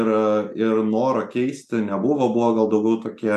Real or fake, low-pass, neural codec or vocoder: real; 14.4 kHz; none